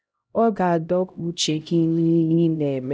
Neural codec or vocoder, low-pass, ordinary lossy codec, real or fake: codec, 16 kHz, 0.5 kbps, X-Codec, HuBERT features, trained on LibriSpeech; none; none; fake